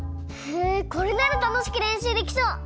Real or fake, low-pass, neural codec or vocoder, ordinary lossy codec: real; none; none; none